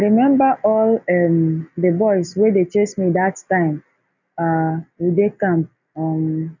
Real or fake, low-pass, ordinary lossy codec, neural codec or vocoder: real; 7.2 kHz; none; none